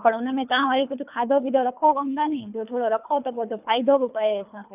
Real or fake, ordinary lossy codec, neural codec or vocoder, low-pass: fake; none; codec, 24 kHz, 3 kbps, HILCodec; 3.6 kHz